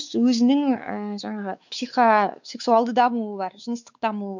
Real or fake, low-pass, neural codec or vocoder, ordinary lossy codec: fake; 7.2 kHz; codec, 16 kHz, 4 kbps, X-Codec, WavLM features, trained on Multilingual LibriSpeech; none